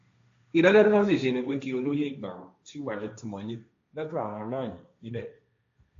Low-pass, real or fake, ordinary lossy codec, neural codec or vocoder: 7.2 kHz; fake; AAC, 48 kbps; codec, 16 kHz, 1.1 kbps, Voila-Tokenizer